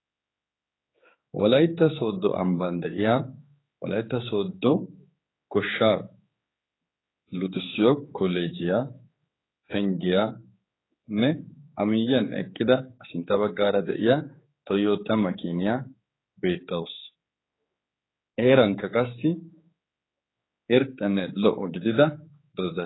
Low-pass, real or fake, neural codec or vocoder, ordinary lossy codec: 7.2 kHz; fake; codec, 16 kHz, 4 kbps, X-Codec, HuBERT features, trained on general audio; AAC, 16 kbps